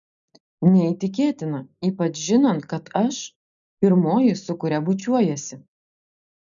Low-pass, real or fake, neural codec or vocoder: 7.2 kHz; real; none